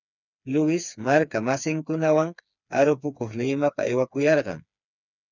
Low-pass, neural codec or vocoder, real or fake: 7.2 kHz; codec, 16 kHz, 4 kbps, FreqCodec, smaller model; fake